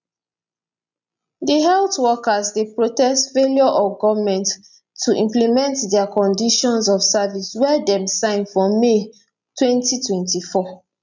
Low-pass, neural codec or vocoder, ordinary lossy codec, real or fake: 7.2 kHz; none; none; real